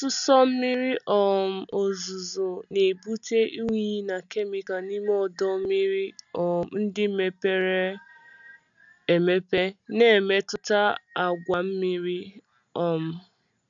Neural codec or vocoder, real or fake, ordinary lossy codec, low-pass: none; real; none; 7.2 kHz